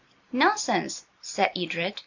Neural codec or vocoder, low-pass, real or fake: none; 7.2 kHz; real